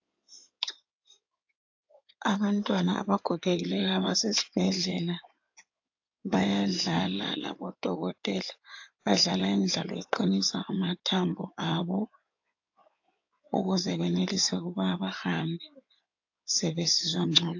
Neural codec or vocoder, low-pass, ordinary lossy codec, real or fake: codec, 16 kHz in and 24 kHz out, 2.2 kbps, FireRedTTS-2 codec; 7.2 kHz; AAC, 48 kbps; fake